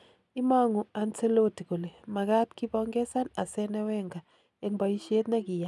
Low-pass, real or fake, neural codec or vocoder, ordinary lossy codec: none; real; none; none